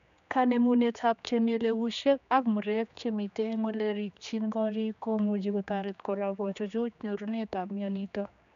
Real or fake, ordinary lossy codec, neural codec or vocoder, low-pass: fake; none; codec, 16 kHz, 2 kbps, X-Codec, HuBERT features, trained on general audio; 7.2 kHz